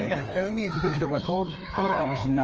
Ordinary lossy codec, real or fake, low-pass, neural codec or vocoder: Opus, 24 kbps; fake; 7.2 kHz; codec, 16 kHz, 2 kbps, FreqCodec, larger model